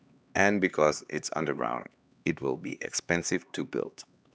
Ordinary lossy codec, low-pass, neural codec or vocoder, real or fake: none; none; codec, 16 kHz, 2 kbps, X-Codec, HuBERT features, trained on LibriSpeech; fake